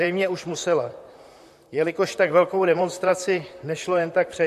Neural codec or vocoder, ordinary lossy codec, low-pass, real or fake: vocoder, 44.1 kHz, 128 mel bands, Pupu-Vocoder; MP3, 64 kbps; 14.4 kHz; fake